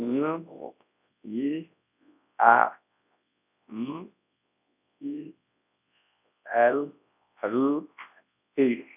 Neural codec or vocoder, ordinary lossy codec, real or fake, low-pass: codec, 24 kHz, 0.9 kbps, WavTokenizer, large speech release; none; fake; 3.6 kHz